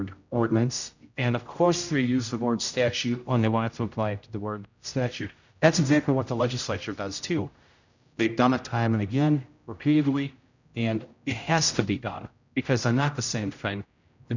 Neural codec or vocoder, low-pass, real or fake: codec, 16 kHz, 0.5 kbps, X-Codec, HuBERT features, trained on general audio; 7.2 kHz; fake